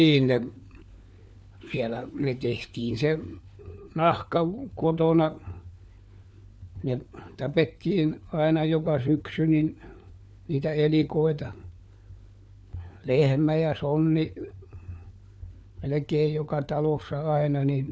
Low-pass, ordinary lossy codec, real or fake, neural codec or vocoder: none; none; fake; codec, 16 kHz, 4 kbps, FunCodec, trained on LibriTTS, 50 frames a second